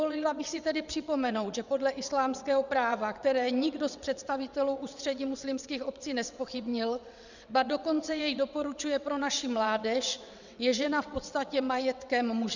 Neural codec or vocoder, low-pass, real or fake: vocoder, 22.05 kHz, 80 mel bands, WaveNeXt; 7.2 kHz; fake